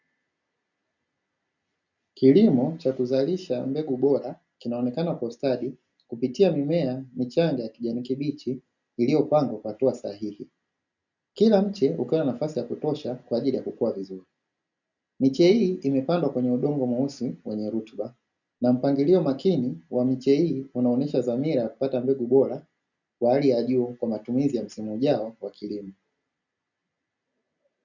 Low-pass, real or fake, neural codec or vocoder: 7.2 kHz; real; none